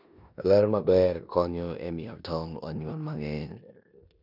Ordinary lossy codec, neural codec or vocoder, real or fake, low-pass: none; codec, 16 kHz in and 24 kHz out, 0.9 kbps, LongCat-Audio-Codec, four codebook decoder; fake; 5.4 kHz